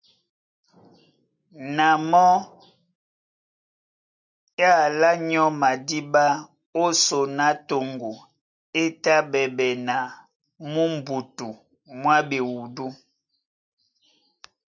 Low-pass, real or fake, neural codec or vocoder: 7.2 kHz; real; none